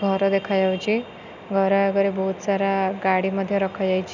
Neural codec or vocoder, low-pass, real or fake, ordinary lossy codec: none; 7.2 kHz; real; none